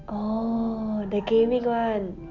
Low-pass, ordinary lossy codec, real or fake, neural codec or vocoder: 7.2 kHz; none; real; none